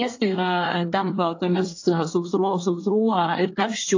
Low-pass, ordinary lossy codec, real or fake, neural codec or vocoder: 7.2 kHz; AAC, 48 kbps; fake; codec, 24 kHz, 1 kbps, SNAC